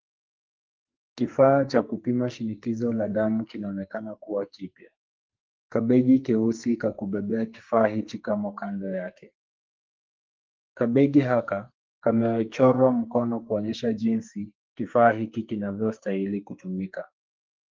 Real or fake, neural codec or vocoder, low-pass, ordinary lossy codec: fake; codec, 44.1 kHz, 2.6 kbps, SNAC; 7.2 kHz; Opus, 32 kbps